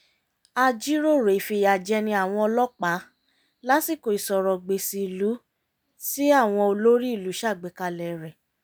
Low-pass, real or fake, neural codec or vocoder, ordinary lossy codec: none; real; none; none